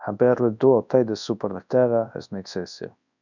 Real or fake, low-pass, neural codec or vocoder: fake; 7.2 kHz; codec, 24 kHz, 0.9 kbps, WavTokenizer, large speech release